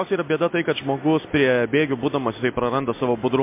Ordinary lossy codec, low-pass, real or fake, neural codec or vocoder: MP3, 24 kbps; 3.6 kHz; real; none